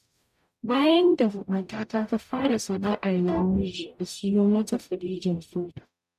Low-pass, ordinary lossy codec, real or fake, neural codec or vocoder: 14.4 kHz; none; fake; codec, 44.1 kHz, 0.9 kbps, DAC